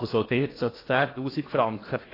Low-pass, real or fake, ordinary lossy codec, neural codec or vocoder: 5.4 kHz; fake; AAC, 24 kbps; codec, 16 kHz in and 24 kHz out, 0.8 kbps, FocalCodec, streaming, 65536 codes